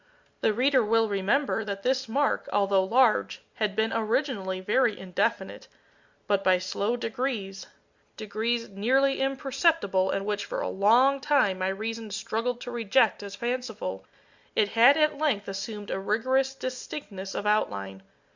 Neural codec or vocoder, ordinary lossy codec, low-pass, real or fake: none; Opus, 64 kbps; 7.2 kHz; real